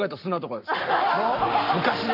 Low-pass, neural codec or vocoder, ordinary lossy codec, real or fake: 5.4 kHz; codec, 44.1 kHz, 7.8 kbps, Pupu-Codec; MP3, 48 kbps; fake